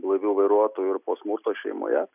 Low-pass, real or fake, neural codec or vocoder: 3.6 kHz; real; none